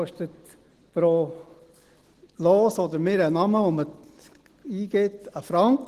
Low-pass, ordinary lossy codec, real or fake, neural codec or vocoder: 14.4 kHz; Opus, 16 kbps; real; none